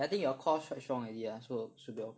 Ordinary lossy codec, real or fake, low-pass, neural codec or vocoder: none; real; none; none